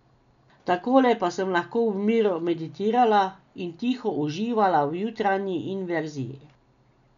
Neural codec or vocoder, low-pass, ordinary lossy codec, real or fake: none; 7.2 kHz; none; real